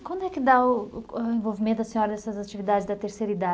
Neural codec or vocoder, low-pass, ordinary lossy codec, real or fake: none; none; none; real